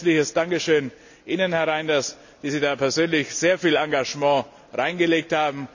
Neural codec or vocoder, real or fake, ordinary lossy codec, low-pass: none; real; none; 7.2 kHz